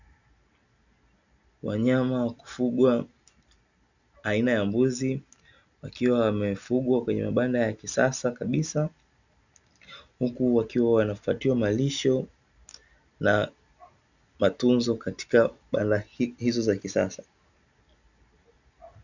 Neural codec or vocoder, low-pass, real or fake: none; 7.2 kHz; real